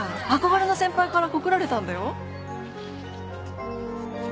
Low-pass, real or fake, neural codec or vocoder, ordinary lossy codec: none; real; none; none